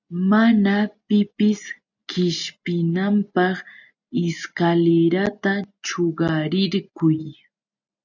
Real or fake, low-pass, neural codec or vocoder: real; 7.2 kHz; none